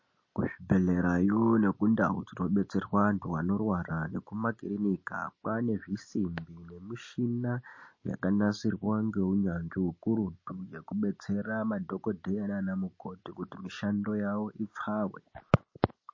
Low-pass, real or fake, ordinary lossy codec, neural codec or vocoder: 7.2 kHz; real; MP3, 32 kbps; none